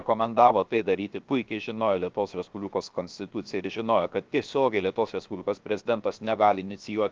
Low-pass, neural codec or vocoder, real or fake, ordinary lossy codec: 7.2 kHz; codec, 16 kHz, 0.7 kbps, FocalCodec; fake; Opus, 24 kbps